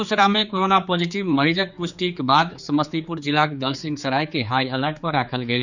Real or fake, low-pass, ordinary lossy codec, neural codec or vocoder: fake; 7.2 kHz; none; codec, 16 kHz, 4 kbps, X-Codec, HuBERT features, trained on general audio